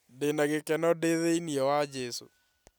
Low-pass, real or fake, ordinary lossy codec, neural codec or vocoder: none; real; none; none